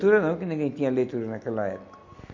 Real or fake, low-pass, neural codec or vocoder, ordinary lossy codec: real; 7.2 kHz; none; MP3, 48 kbps